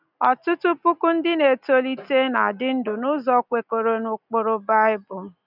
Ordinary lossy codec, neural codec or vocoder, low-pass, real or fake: none; none; 5.4 kHz; real